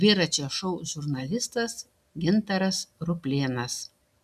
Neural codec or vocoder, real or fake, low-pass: none; real; 14.4 kHz